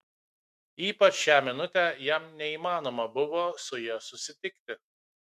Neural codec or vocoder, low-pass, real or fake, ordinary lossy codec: autoencoder, 48 kHz, 128 numbers a frame, DAC-VAE, trained on Japanese speech; 14.4 kHz; fake; MP3, 64 kbps